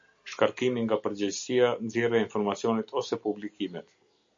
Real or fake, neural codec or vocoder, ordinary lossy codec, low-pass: real; none; MP3, 48 kbps; 7.2 kHz